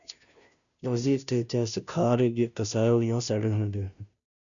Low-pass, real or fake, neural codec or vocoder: 7.2 kHz; fake; codec, 16 kHz, 0.5 kbps, FunCodec, trained on Chinese and English, 25 frames a second